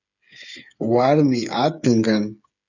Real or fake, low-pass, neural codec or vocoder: fake; 7.2 kHz; codec, 16 kHz, 8 kbps, FreqCodec, smaller model